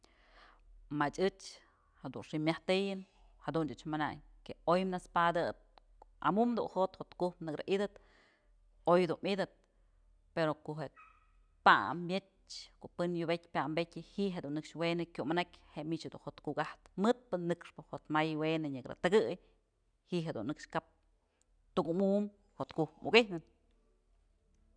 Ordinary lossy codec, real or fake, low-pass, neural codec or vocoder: none; real; 9.9 kHz; none